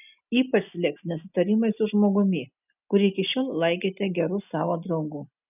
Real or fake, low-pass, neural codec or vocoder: real; 3.6 kHz; none